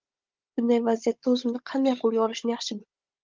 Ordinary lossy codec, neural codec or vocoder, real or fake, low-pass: Opus, 24 kbps; codec, 16 kHz, 16 kbps, FunCodec, trained on Chinese and English, 50 frames a second; fake; 7.2 kHz